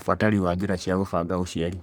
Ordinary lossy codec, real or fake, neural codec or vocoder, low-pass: none; fake; codec, 44.1 kHz, 3.4 kbps, Pupu-Codec; none